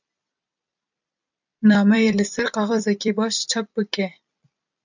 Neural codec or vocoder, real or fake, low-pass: vocoder, 44.1 kHz, 128 mel bands every 256 samples, BigVGAN v2; fake; 7.2 kHz